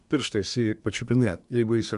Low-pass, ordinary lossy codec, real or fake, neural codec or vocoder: 10.8 kHz; MP3, 96 kbps; fake; codec, 24 kHz, 1 kbps, SNAC